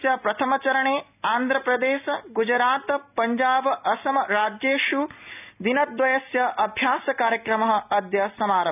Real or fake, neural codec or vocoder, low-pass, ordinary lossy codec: real; none; 3.6 kHz; none